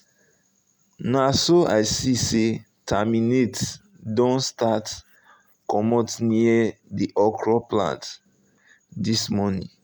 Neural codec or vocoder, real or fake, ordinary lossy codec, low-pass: none; real; none; none